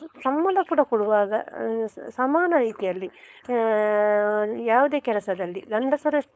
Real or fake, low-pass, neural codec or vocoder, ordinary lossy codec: fake; none; codec, 16 kHz, 4.8 kbps, FACodec; none